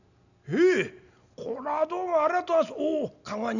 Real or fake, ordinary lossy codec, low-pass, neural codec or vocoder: real; none; 7.2 kHz; none